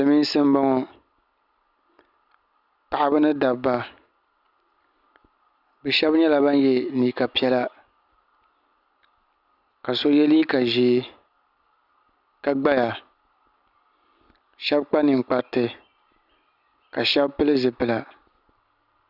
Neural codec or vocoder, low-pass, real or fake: none; 5.4 kHz; real